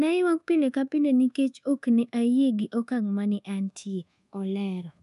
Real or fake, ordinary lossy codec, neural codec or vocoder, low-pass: fake; none; codec, 24 kHz, 1.2 kbps, DualCodec; 10.8 kHz